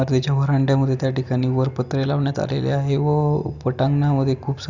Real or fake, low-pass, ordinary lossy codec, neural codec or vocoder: real; 7.2 kHz; none; none